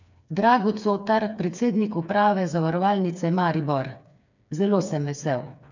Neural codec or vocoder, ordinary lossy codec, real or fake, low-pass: codec, 16 kHz, 4 kbps, FreqCodec, smaller model; none; fake; 7.2 kHz